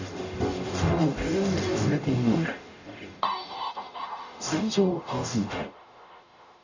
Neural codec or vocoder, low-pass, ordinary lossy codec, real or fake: codec, 44.1 kHz, 0.9 kbps, DAC; 7.2 kHz; none; fake